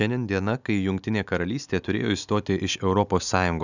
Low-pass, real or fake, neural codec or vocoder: 7.2 kHz; real; none